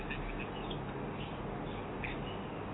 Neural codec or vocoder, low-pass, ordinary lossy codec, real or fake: none; 7.2 kHz; AAC, 16 kbps; real